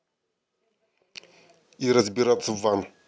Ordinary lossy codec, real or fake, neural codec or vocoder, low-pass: none; real; none; none